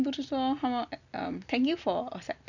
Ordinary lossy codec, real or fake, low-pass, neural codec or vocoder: none; real; 7.2 kHz; none